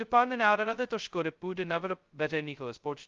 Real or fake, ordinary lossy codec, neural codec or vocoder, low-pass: fake; Opus, 32 kbps; codec, 16 kHz, 0.2 kbps, FocalCodec; 7.2 kHz